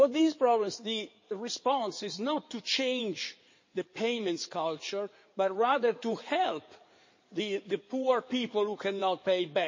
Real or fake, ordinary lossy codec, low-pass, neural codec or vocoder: fake; MP3, 32 kbps; 7.2 kHz; codec, 16 kHz in and 24 kHz out, 2.2 kbps, FireRedTTS-2 codec